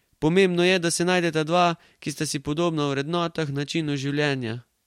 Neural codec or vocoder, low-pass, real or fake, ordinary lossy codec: none; 19.8 kHz; real; MP3, 64 kbps